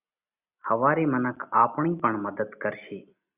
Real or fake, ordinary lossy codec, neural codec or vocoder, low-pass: real; Opus, 64 kbps; none; 3.6 kHz